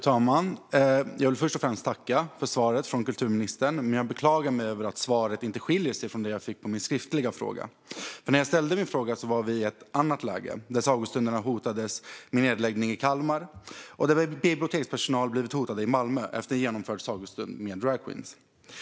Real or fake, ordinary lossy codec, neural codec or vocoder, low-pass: real; none; none; none